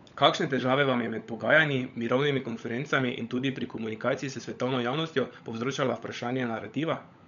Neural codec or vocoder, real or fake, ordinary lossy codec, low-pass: codec, 16 kHz, 16 kbps, FunCodec, trained on LibriTTS, 50 frames a second; fake; none; 7.2 kHz